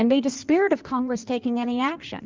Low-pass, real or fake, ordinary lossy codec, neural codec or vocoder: 7.2 kHz; fake; Opus, 32 kbps; codec, 16 kHz in and 24 kHz out, 1.1 kbps, FireRedTTS-2 codec